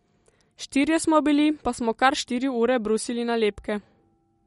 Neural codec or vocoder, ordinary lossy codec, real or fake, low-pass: none; MP3, 48 kbps; real; 19.8 kHz